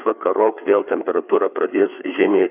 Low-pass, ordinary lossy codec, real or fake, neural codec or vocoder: 3.6 kHz; AAC, 32 kbps; fake; codec, 16 kHz, 4 kbps, FreqCodec, larger model